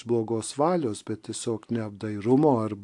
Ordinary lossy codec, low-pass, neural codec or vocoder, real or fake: AAC, 64 kbps; 10.8 kHz; none; real